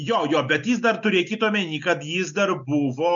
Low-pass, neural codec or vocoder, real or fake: 7.2 kHz; none; real